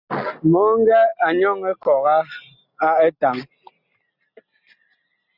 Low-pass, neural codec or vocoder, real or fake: 5.4 kHz; none; real